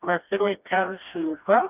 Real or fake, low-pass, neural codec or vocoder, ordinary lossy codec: fake; 3.6 kHz; codec, 16 kHz, 1 kbps, FreqCodec, smaller model; none